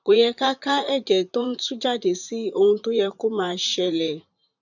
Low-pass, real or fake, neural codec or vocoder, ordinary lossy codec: 7.2 kHz; fake; vocoder, 44.1 kHz, 128 mel bands, Pupu-Vocoder; none